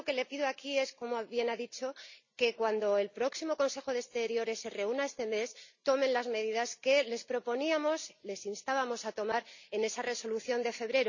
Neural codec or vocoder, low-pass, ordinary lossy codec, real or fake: none; 7.2 kHz; none; real